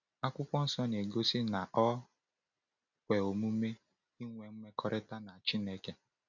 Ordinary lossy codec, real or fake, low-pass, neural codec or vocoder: none; real; 7.2 kHz; none